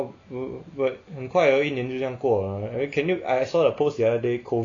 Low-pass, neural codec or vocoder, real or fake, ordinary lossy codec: 7.2 kHz; none; real; AAC, 48 kbps